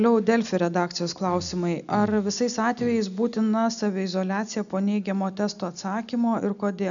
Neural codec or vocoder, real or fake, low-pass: none; real; 7.2 kHz